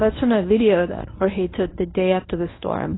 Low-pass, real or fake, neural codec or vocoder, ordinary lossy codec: 7.2 kHz; fake; codec, 24 kHz, 0.9 kbps, WavTokenizer, medium speech release version 1; AAC, 16 kbps